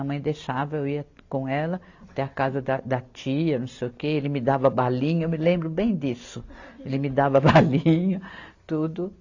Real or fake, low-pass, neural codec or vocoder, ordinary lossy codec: real; 7.2 kHz; none; AAC, 48 kbps